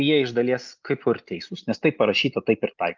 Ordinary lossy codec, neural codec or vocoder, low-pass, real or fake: Opus, 32 kbps; none; 7.2 kHz; real